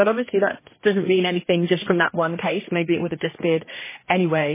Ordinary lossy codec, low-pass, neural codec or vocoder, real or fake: MP3, 16 kbps; 3.6 kHz; codec, 16 kHz, 1 kbps, X-Codec, HuBERT features, trained on general audio; fake